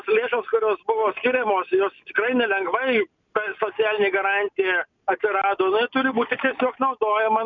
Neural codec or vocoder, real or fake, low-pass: none; real; 7.2 kHz